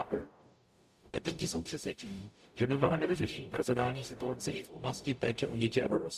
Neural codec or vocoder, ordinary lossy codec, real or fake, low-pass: codec, 44.1 kHz, 0.9 kbps, DAC; Opus, 64 kbps; fake; 14.4 kHz